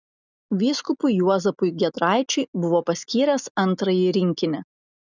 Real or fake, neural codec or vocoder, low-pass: real; none; 7.2 kHz